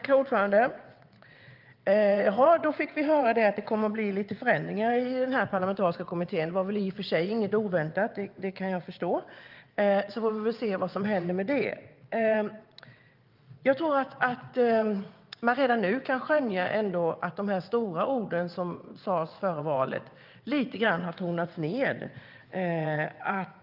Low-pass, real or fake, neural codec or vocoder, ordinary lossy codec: 5.4 kHz; fake; vocoder, 22.05 kHz, 80 mel bands, WaveNeXt; Opus, 32 kbps